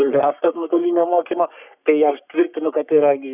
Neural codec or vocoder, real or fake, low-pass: codec, 44.1 kHz, 3.4 kbps, Pupu-Codec; fake; 3.6 kHz